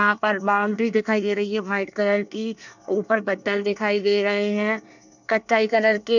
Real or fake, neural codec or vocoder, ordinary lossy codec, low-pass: fake; codec, 24 kHz, 1 kbps, SNAC; none; 7.2 kHz